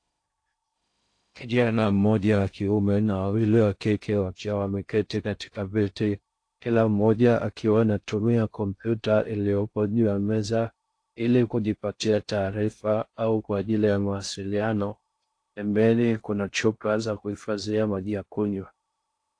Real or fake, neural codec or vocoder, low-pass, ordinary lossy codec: fake; codec, 16 kHz in and 24 kHz out, 0.6 kbps, FocalCodec, streaming, 2048 codes; 9.9 kHz; AAC, 48 kbps